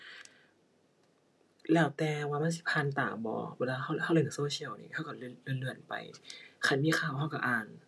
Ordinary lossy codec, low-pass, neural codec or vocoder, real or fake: none; none; none; real